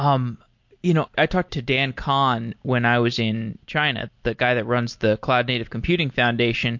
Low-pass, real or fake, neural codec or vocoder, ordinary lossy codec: 7.2 kHz; real; none; MP3, 48 kbps